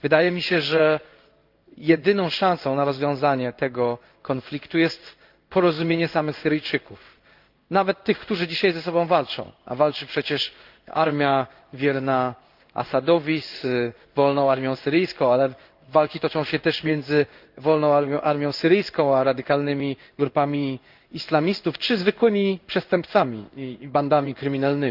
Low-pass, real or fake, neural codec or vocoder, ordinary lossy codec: 5.4 kHz; fake; codec, 16 kHz in and 24 kHz out, 1 kbps, XY-Tokenizer; Opus, 24 kbps